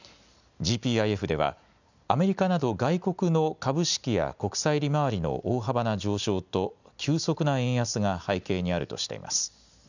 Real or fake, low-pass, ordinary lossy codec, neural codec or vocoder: real; 7.2 kHz; none; none